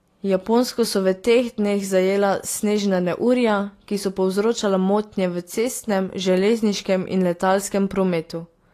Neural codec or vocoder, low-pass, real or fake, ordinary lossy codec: none; 14.4 kHz; real; AAC, 48 kbps